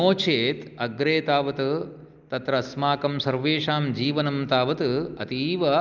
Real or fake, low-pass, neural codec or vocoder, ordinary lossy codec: real; 7.2 kHz; none; Opus, 24 kbps